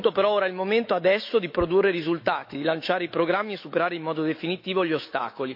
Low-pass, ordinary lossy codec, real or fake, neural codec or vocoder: 5.4 kHz; MP3, 32 kbps; fake; autoencoder, 48 kHz, 128 numbers a frame, DAC-VAE, trained on Japanese speech